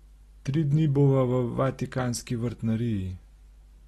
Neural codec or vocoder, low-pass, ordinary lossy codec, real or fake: none; 14.4 kHz; AAC, 32 kbps; real